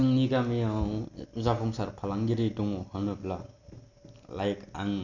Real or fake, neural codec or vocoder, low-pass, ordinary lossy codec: real; none; 7.2 kHz; none